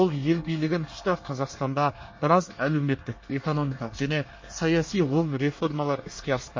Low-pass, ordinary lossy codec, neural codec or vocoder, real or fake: 7.2 kHz; MP3, 32 kbps; codec, 24 kHz, 1 kbps, SNAC; fake